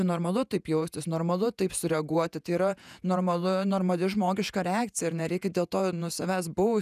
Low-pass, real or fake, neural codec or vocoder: 14.4 kHz; fake; vocoder, 48 kHz, 128 mel bands, Vocos